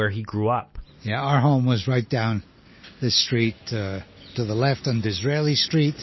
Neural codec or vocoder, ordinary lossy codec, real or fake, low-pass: none; MP3, 24 kbps; real; 7.2 kHz